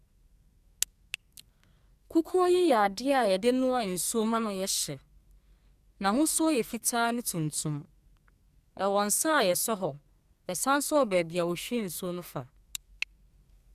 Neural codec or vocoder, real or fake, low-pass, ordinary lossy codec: codec, 44.1 kHz, 2.6 kbps, SNAC; fake; 14.4 kHz; Opus, 64 kbps